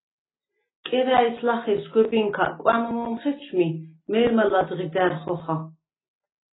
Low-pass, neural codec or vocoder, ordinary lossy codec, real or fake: 7.2 kHz; none; AAC, 16 kbps; real